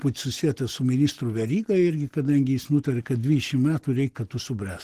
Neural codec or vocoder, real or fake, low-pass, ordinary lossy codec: none; real; 14.4 kHz; Opus, 16 kbps